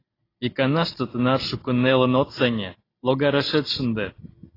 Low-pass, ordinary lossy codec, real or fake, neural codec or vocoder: 5.4 kHz; AAC, 24 kbps; real; none